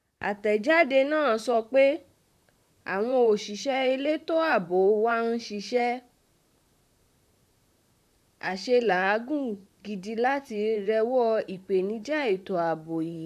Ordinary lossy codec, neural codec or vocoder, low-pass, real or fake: none; vocoder, 44.1 kHz, 128 mel bands, Pupu-Vocoder; 14.4 kHz; fake